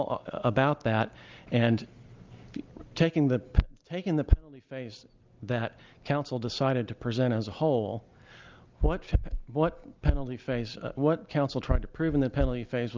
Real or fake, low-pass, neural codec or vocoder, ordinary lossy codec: real; 7.2 kHz; none; Opus, 32 kbps